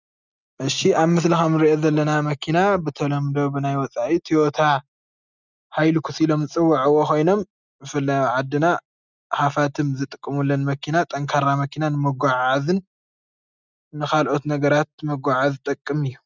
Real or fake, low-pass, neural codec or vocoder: real; 7.2 kHz; none